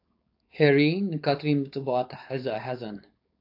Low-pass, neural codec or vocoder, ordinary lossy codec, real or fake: 5.4 kHz; codec, 16 kHz, 4.8 kbps, FACodec; AAC, 32 kbps; fake